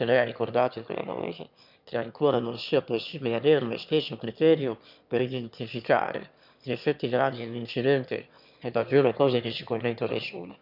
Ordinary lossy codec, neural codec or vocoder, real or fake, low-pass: none; autoencoder, 22.05 kHz, a latent of 192 numbers a frame, VITS, trained on one speaker; fake; 5.4 kHz